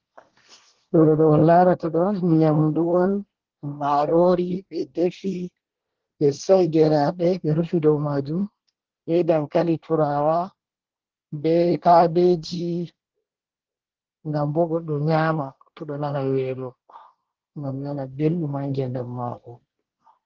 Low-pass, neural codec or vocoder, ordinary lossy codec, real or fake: 7.2 kHz; codec, 24 kHz, 1 kbps, SNAC; Opus, 16 kbps; fake